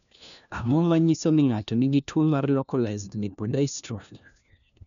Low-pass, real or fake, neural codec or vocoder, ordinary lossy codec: 7.2 kHz; fake; codec, 16 kHz, 1 kbps, FunCodec, trained on LibriTTS, 50 frames a second; none